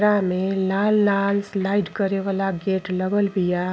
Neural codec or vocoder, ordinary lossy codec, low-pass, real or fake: none; none; none; real